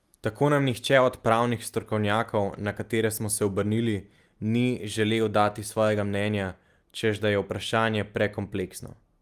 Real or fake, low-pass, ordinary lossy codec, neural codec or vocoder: real; 14.4 kHz; Opus, 32 kbps; none